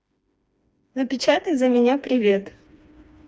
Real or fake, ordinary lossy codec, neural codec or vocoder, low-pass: fake; none; codec, 16 kHz, 2 kbps, FreqCodec, smaller model; none